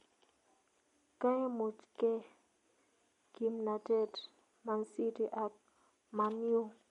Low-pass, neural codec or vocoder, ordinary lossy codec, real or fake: 19.8 kHz; none; MP3, 48 kbps; real